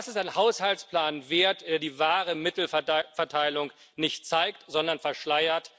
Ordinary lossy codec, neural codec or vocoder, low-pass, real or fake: none; none; none; real